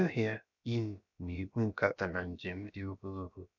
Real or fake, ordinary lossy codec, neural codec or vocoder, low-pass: fake; none; codec, 16 kHz, about 1 kbps, DyCAST, with the encoder's durations; 7.2 kHz